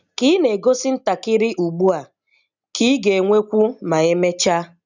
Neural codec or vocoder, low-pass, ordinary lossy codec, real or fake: none; 7.2 kHz; none; real